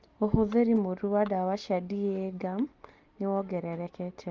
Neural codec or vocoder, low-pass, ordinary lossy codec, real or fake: none; 7.2 kHz; Opus, 32 kbps; real